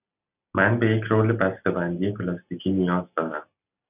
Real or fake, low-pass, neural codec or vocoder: real; 3.6 kHz; none